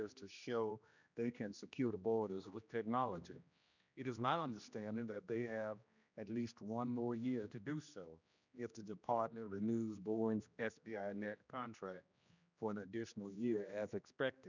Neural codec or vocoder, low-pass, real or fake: codec, 16 kHz, 1 kbps, X-Codec, HuBERT features, trained on general audio; 7.2 kHz; fake